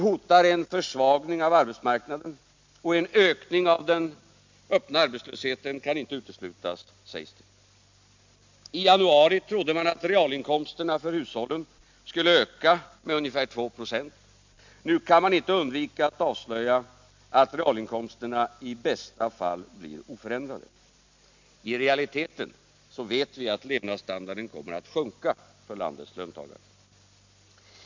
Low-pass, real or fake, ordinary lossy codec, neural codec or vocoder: 7.2 kHz; real; MP3, 64 kbps; none